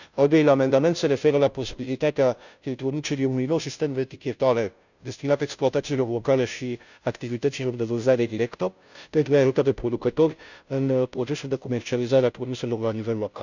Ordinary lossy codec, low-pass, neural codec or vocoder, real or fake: none; 7.2 kHz; codec, 16 kHz, 0.5 kbps, FunCodec, trained on Chinese and English, 25 frames a second; fake